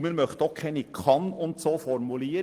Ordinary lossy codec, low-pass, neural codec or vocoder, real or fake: Opus, 24 kbps; 14.4 kHz; none; real